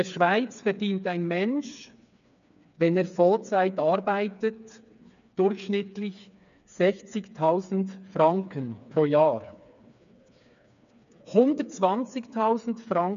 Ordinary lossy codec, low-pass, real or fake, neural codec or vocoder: MP3, 96 kbps; 7.2 kHz; fake; codec, 16 kHz, 4 kbps, FreqCodec, smaller model